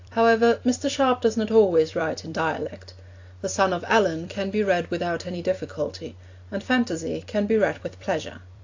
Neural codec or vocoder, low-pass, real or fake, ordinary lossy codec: vocoder, 44.1 kHz, 128 mel bands every 256 samples, BigVGAN v2; 7.2 kHz; fake; AAC, 48 kbps